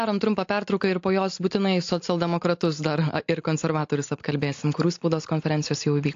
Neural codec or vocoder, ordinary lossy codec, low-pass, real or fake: none; AAC, 48 kbps; 7.2 kHz; real